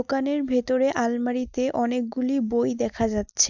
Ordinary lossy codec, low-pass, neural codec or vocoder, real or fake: none; 7.2 kHz; none; real